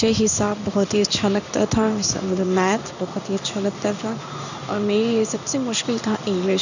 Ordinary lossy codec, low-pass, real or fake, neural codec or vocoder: none; 7.2 kHz; fake; codec, 16 kHz in and 24 kHz out, 1 kbps, XY-Tokenizer